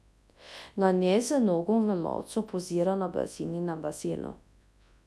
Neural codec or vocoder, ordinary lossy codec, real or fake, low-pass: codec, 24 kHz, 0.9 kbps, WavTokenizer, large speech release; none; fake; none